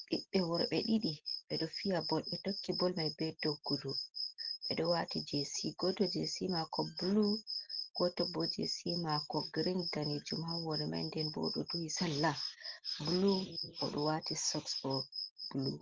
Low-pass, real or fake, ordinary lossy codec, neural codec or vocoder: 7.2 kHz; real; Opus, 16 kbps; none